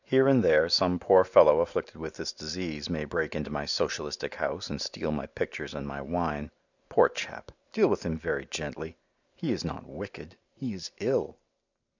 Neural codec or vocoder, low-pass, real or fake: none; 7.2 kHz; real